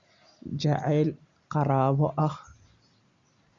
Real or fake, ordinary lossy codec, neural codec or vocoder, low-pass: real; Opus, 64 kbps; none; 7.2 kHz